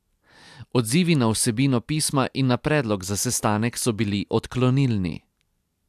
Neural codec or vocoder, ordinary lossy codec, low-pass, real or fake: none; AAC, 96 kbps; 14.4 kHz; real